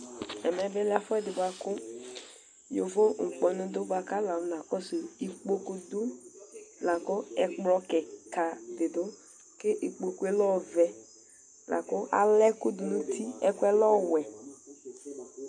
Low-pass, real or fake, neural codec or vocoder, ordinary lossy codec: 9.9 kHz; real; none; AAC, 64 kbps